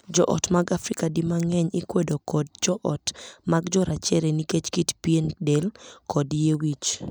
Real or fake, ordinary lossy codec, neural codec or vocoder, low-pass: real; none; none; none